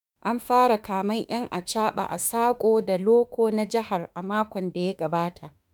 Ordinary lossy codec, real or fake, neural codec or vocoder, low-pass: none; fake; autoencoder, 48 kHz, 32 numbers a frame, DAC-VAE, trained on Japanese speech; none